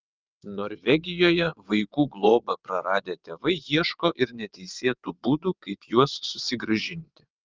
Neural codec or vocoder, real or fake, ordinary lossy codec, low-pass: vocoder, 22.05 kHz, 80 mel bands, WaveNeXt; fake; Opus, 24 kbps; 7.2 kHz